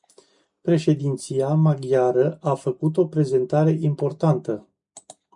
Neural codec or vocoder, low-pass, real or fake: none; 10.8 kHz; real